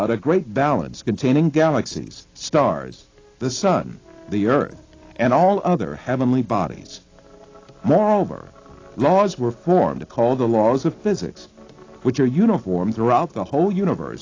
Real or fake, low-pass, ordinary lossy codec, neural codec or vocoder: real; 7.2 kHz; AAC, 32 kbps; none